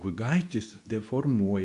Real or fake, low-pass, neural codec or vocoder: fake; 10.8 kHz; codec, 24 kHz, 0.9 kbps, WavTokenizer, medium speech release version 2